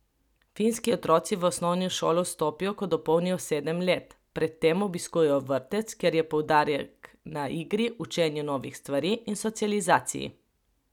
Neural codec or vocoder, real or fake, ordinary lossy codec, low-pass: none; real; none; 19.8 kHz